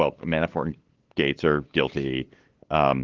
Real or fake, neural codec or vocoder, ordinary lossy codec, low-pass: fake; codec, 16 kHz, 8 kbps, FunCodec, trained on LibriTTS, 25 frames a second; Opus, 16 kbps; 7.2 kHz